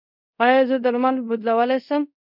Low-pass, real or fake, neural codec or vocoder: 5.4 kHz; fake; codec, 24 kHz, 0.5 kbps, DualCodec